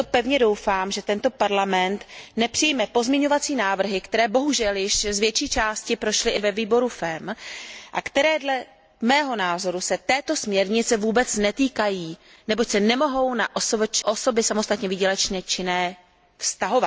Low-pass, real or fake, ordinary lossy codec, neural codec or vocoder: none; real; none; none